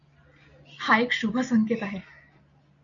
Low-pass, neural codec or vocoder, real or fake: 7.2 kHz; none; real